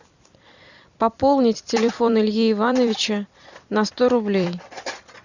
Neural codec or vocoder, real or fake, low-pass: vocoder, 44.1 kHz, 128 mel bands every 256 samples, BigVGAN v2; fake; 7.2 kHz